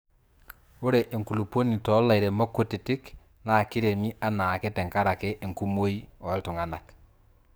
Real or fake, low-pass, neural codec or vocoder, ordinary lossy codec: fake; none; codec, 44.1 kHz, 7.8 kbps, DAC; none